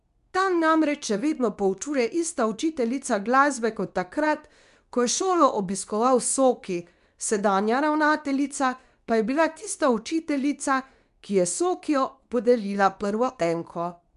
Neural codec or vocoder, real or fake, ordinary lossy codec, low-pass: codec, 24 kHz, 0.9 kbps, WavTokenizer, medium speech release version 2; fake; none; 10.8 kHz